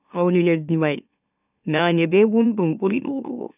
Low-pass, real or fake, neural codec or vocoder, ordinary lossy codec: 3.6 kHz; fake; autoencoder, 44.1 kHz, a latent of 192 numbers a frame, MeloTTS; none